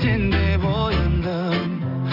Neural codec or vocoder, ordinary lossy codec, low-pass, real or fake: none; none; 5.4 kHz; real